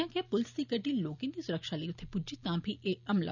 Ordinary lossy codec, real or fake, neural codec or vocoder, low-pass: none; real; none; 7.2 kHz